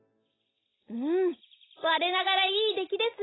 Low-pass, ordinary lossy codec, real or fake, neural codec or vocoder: 7.2 kHz; AAC, 16 kbps; real; none